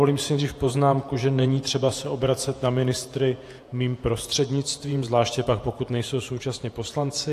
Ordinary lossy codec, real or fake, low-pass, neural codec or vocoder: AAC, 64 kbps; fake; 14.4 kHz; vocoder, 48 kHz, 128 mel bands, Vocos